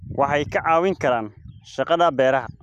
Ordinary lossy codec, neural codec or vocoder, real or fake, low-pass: none; none; real; 14.4 kHz